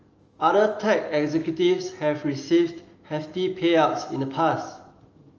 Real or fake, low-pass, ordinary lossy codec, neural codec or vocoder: fake; 7.2 kHz; Opus, 24 kbps; autoencoder, 48 kHz, 128 numbers a frame, DAC-VAE, trained on Japanese speech